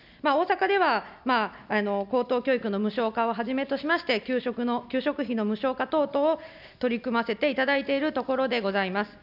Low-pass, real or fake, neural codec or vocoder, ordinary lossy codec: 5.4 kHz; real; none; none